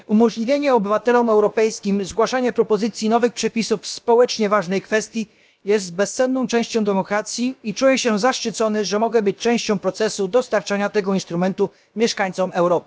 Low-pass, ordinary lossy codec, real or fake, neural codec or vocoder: none; none; fake; codec, 16 kHz, about 1 kbps, DyCAST, with the encoder's durations